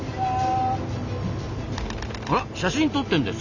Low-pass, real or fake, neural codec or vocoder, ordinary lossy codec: 7.2 kHz; real; none; none